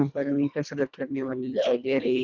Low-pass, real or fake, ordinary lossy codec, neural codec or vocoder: 7.2 kHz; fake; none; codec, 24 kHz, 1.5 kbps, HILCodec